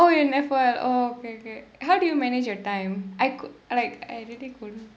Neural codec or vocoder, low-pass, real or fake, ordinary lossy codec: none; none; real; none